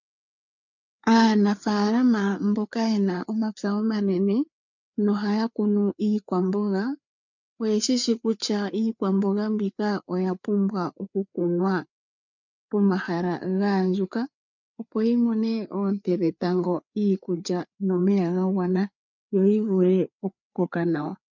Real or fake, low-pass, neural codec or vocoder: fake; 7.2 kHz; codec, 16 kHz, 4 kbps, FreqCodec, larger model